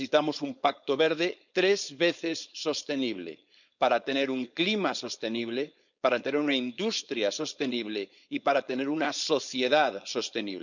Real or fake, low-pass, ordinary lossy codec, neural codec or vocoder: fake; 7.2 kHz; none; codec, 16 kHz, 4.8 kbps, FACodec